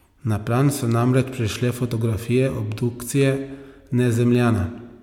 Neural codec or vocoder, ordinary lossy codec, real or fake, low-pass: none; MP3, 96 kbps; real; 19.8 kHz